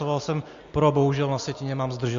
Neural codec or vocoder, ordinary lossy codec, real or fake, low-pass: none; MP3, 48 kbps; real; 7.2 kHz